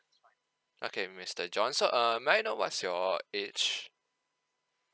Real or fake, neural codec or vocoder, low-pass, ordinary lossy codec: real; none; none; none